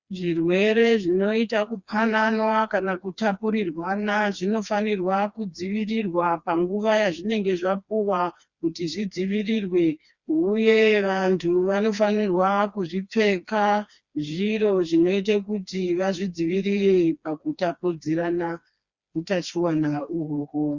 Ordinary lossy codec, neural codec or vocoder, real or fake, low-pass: Opus, 64 kbps; codec, 16 kHz, 2 kbps, FreqCodec, smaller model; fake; 7.2 kHz